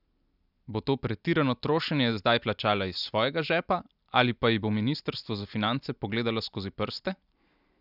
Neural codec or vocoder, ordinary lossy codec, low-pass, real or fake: none; none; 5.4 kHz; real